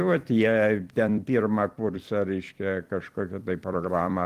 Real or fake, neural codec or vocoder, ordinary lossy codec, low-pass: fake; vocoder, 44.1 kHz, 128 mel bands every 256 samples, BigVGAN v2; Opus, 24 kbps; 14.4 kHz